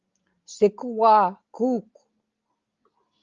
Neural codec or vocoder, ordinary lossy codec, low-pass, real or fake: none; Opus, 32 kbps; 7.2 kHz; real